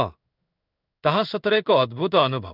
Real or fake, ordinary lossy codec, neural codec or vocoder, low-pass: fake; none; codec, 16 kHz in and 24 kHz out, 1 kbps, XY-Tokenizer; 5.4 kHz